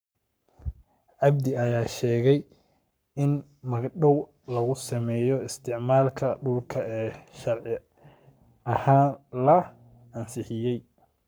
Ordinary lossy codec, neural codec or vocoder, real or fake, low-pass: none; codec, 44.1 kHz, 7.8 kbps, Pupu-Codec; fake; none